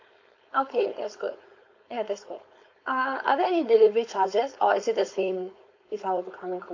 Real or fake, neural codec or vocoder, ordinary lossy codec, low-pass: fake; codec, 16 kHz, 4.8 kbps, FACodec; MP3, 48 kbps; 7.2 kHz